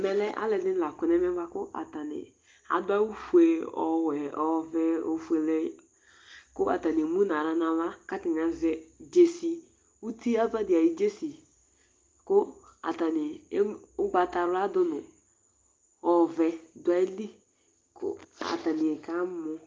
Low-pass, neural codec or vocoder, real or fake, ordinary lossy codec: 7.2 kHz; none; real; Opus, 32 kbps